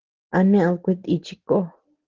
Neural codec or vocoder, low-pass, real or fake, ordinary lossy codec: none; 7.2 kHz; real; Opus, 16 kbps